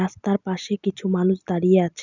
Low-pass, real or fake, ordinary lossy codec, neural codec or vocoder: 7.2 kHz; real; none; none